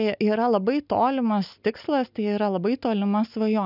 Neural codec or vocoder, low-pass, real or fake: none; 5.4 kHz; real